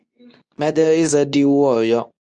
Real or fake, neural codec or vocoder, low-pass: fake; codec, 24 kHz, 0.9 kbps, WavTokenizer, medium speech release version 2; 10.8 kHz